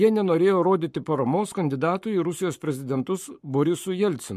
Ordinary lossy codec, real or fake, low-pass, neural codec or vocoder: MP3, 64 kbps; fake; 14.4 kHz; codec, 44.1 kHz, 7.8 kbps, Pupu-Codec